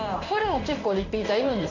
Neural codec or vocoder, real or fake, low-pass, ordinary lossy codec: codec, 16 kHz in and 24 kHz out, 1 kbps, XY-Tokenizer; fake; 7.2 kHz; none